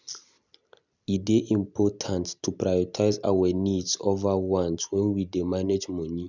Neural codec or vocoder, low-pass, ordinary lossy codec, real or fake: none; 7.2 kHz; none; real